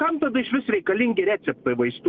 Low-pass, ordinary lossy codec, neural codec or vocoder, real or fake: 7.2 kHz; Opus, 24 kbps; none; real